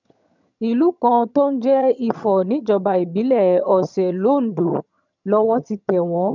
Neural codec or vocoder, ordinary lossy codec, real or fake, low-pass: vocoder, 22.05 kHz, 80 mel bands, HiFi-GAN; none; fake; 7.2 kHz